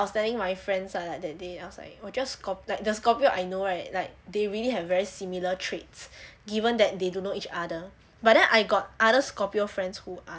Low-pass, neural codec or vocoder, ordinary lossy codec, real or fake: none; none; none; real